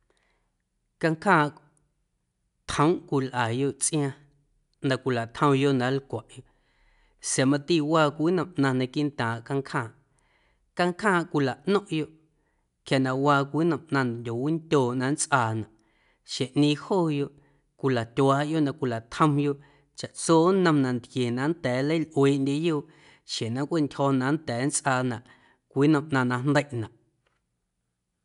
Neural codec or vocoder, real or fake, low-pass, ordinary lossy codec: none; real; 10.8 kHz; none